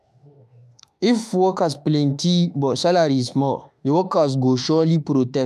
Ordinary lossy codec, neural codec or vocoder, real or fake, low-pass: none; autoencoder, 48 kHz, 32 numbers a frame, DAC-VAE, trained on Japanese speech; fake; 14.4 kHz